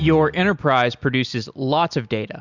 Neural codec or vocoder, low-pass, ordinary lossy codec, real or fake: none; 7.2 kHz; Opus, 64 kbps; real